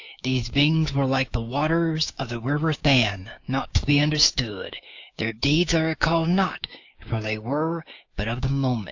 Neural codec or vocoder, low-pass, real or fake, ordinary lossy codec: codec, 16 kHz, 6 kbps, DAC; 7.2 kHz; fake; AAC, 48 kbps